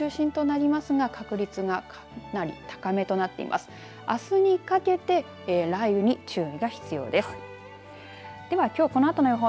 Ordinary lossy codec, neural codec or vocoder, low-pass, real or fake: none; none; none; real